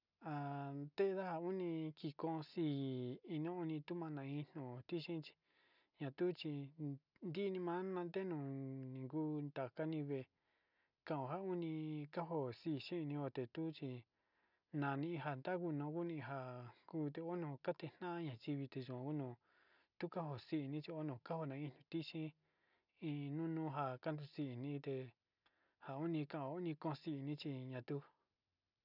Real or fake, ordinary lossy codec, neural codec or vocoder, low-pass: real; none; none; 5.4 kHz